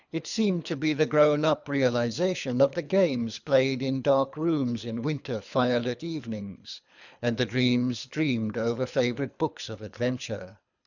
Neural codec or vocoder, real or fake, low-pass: codec, 24 kHz, 3 kbps, HILCodec; fake; 7.2 kHz